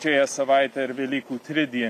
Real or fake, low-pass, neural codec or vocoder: real; 14.4 kHz; none